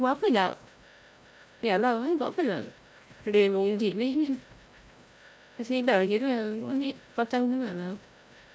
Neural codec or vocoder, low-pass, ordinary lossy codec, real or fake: codec, 16 kHz, 0.5 kbps, FreqCodec, larger model; none; none; fake